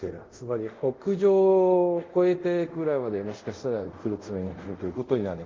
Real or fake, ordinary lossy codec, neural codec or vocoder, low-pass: fake; Opus, 24 kbps; codec, 24 kHz, 0.5 kbps, DualCodec; 7.2 kHz